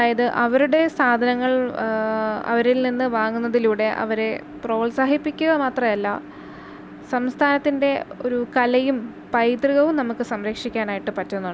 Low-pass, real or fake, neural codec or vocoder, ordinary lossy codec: none; real; none; none